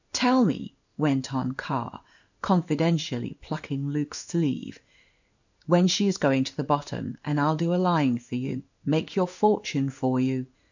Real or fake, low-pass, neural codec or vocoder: fake; 7.2 kHz; codec, 16 kHz in and 24 kHz out, 1 kbps, XY-Tokenizer